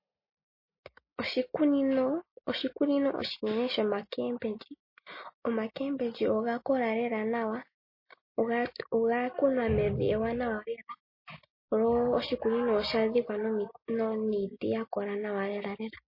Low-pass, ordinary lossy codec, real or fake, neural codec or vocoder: 5.4 kHz; MP3, 24 kbps; real; none